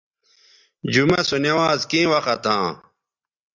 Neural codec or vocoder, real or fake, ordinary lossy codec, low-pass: none; real; Opus, 64 kbps; 7.2 kHz